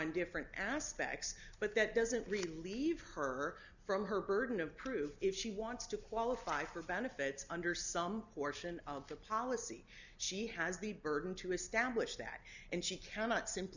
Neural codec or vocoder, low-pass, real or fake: none; 7.2 kHz; real